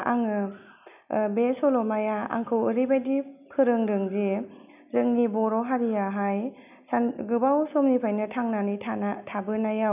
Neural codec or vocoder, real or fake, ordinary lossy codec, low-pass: none; real; none; 3.6 kHz